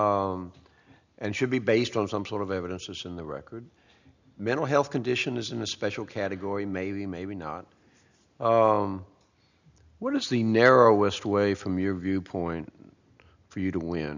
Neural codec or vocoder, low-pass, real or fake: none; 7.2 kHz; real